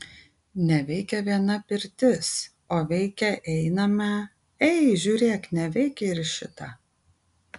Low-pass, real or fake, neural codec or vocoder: 10.8 kHz; real; none